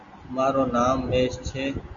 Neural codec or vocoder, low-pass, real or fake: none; 7.2 kHz; real